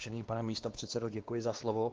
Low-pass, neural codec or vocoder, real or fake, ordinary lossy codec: 7.2 kHz; codec, 16 kHz, 2 kbps, X-Codec, WavLM features, trained on Multilingual LibriSpeech; fake; Opus, 16 kbps